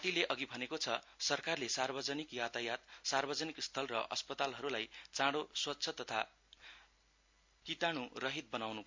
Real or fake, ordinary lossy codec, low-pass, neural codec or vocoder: real; MP3, 48 kbps; 7.2 kHz; none